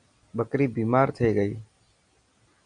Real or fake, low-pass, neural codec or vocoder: real; 9.9 kHz; none